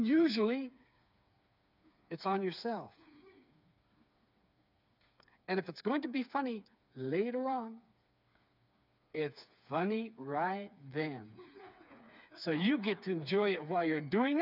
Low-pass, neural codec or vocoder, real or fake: 5.4 kHz; codec, 16 kHz, 4 kbps, FreqCodec, smaller model; fake